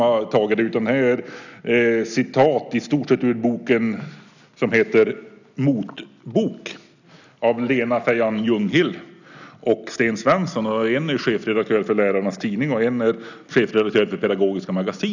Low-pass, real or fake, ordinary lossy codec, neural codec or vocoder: 7.2 kHz; real; none; none